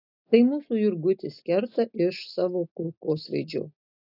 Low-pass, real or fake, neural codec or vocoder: 5.4 kHz; real; none